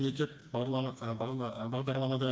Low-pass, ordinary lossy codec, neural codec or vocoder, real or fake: none; none; codec, 16 kHz, 2 kbps, FreqCodec, smaller model; fake